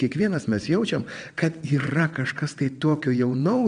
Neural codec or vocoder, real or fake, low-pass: vocoder, 22.05 kHz, 80 mel bands, WaveNeXt; fake; 9.9 kHz